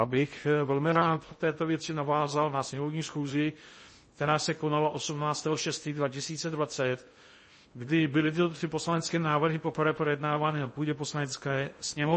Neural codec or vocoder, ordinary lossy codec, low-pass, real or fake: codec, 16 kHz in and 24 kHz out, 0.6 kbps, FocalCodec, streaming, 2048 codes; MP3, 32 kbps; 10.8 kHz; fake